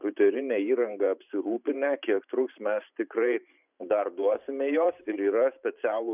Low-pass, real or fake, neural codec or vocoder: 3.6 kHz; fake; vocoder, 44.1 kHz, 128 mel bands every 512 samples, BigVGAN v2